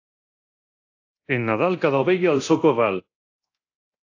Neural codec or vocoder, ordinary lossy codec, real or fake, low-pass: codec, 24 kHz, 0.9 kbps, DualCodec; AAC, 48 kbps; fake; 7.2 kHz